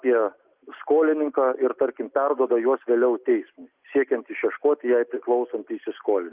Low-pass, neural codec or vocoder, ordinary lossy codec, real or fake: 3.6 kHz; none; Opus, 24 kbps; real